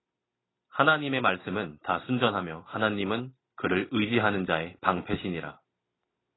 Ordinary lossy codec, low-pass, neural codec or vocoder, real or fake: AAC, 16 kbps; 7.2 kHz; none; real